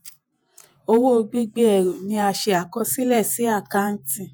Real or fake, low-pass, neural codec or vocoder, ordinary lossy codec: fake; none; vocoder, 48 kHz, 128 mel bands, Vocos; none